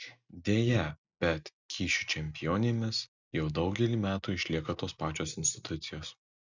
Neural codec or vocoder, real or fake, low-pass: none; real; 7.2 kHz